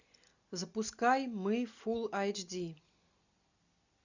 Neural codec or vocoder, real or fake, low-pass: none; real; 7.2 kHz